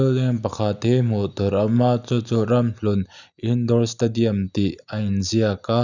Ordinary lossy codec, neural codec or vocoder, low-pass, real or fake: none; none; 7.2 kHz; real